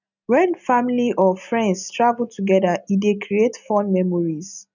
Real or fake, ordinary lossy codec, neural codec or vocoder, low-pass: real; none; none; 7.2 kHz